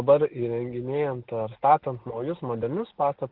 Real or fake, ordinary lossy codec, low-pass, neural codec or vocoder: real; Opus, 16 kbps; 5.4 kHz; none